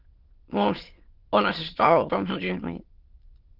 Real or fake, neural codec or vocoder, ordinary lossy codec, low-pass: fake; autoencoder, 22.05 kHz, a latent of 192 numbers a frame, VITS, trained on many speakers; Opus, 16 kbps; 5.4 kHz